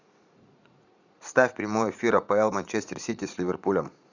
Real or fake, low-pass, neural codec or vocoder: fake; 7.2 kHz; vocoder, 44.1 kHz, 80 mel bands, Vocos